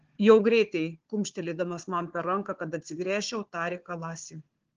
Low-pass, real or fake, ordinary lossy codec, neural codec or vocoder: 7.2 kHz; fake; Opus, 16 kbps; codec, 16 kHz, 4 kbps, FunCodec, trained on Chinese and English, 50 frames a second